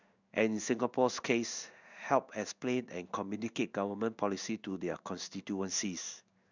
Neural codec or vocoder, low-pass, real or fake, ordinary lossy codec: codec, 16 kHz in and 24 kHz out, 1 kbps, XY-Tokenizer; 7.2 kHz; fake; none